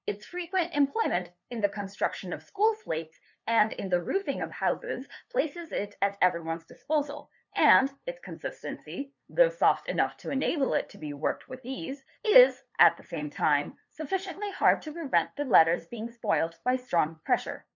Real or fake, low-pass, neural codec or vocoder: fake; 7.2 kHz; codec, 16 kHz, 2 kbps, FunCodec, trained on LibriTTS, 25 frames a second